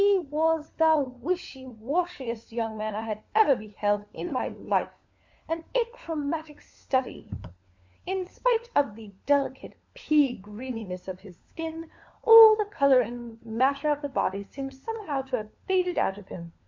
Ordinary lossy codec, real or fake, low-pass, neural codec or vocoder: MP3, 48 kbps; fake; 7.2 kHz; codec, 16 kHz, 4 kbps, FunCodec, trained on LibriTTS, 50 frames a second